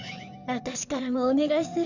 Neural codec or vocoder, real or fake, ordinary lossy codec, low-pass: codec, 16 kHz in and 24 kHz out, 2.2 kbps, FireRedTTS-2 codec; fake; none; 7.2 kHz